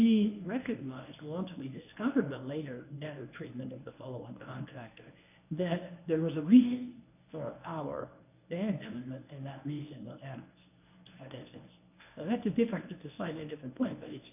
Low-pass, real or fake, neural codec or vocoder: 3.6 kHz; fake; codec, 24 kHz, 0.9 kbps, WavTokenizer, medium speech release version 1